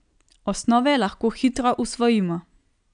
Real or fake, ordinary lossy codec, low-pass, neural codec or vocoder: real; none; 9.9 kHz; none